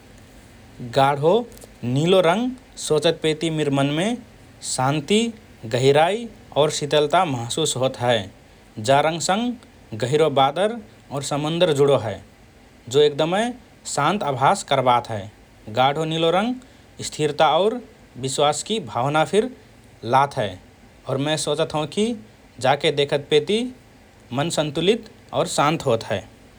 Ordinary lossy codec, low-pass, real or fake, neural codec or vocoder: none; none; real; none